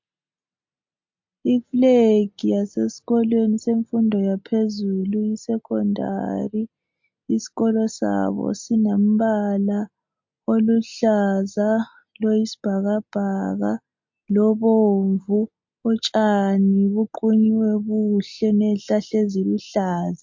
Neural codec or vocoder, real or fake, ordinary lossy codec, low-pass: none; real; MP3, 48 kbps; 7.2 kHz